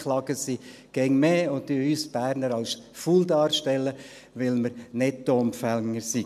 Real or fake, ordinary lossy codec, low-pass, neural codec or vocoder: real; none; 14.4 kHz; none